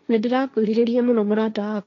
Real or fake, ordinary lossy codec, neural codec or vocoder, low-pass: fake; none; codec, 16 kHz, 1.1 kbps, Voila-Tokenizer; 7.2 kHz